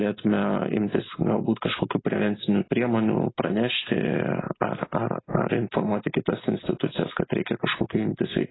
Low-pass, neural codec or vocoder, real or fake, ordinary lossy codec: 7.2 kHz; none; real; AAC, 16 kbps